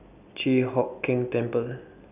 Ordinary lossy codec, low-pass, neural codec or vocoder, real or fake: none; 3.6 kHz; none; real